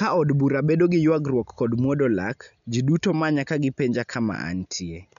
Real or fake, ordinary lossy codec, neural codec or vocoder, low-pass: real; none; none; 7.2 kHz